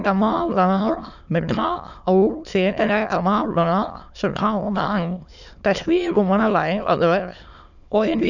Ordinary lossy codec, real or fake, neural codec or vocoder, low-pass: none; fake; autoencoder, 22.05 kHz, a latent of 192 numbers a frame, VITS, trained on many speakers; 7.2 kHz